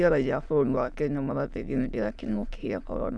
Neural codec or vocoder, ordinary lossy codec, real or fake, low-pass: autoencoder, 22.05 kHz, a latent of 192 numbers a frame, VITS, trained on many speakers; none; fake; none